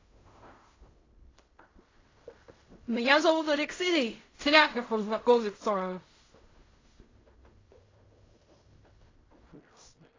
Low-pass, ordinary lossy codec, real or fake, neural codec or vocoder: 7.2 kHz; AAC, 32 kbps; fake; codec, 16 kHz in and 24 kHz out, 0.4 kbps, LongCat-Audio-Codec, fine tuned four codebook decoder